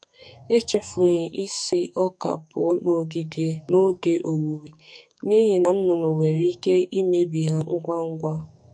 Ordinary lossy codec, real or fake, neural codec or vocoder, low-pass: MP3, 64 kbps; fake; codec, 44.1 kHz, 2.6 kbps, SNAC; 9.9 kHz